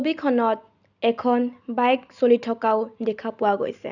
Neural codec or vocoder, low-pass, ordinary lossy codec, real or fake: none; 7.2 kHz; none; real